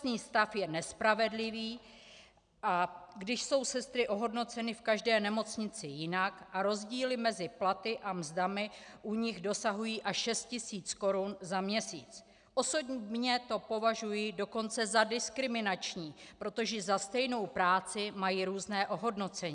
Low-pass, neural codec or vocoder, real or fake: 9.9 kHz; none; real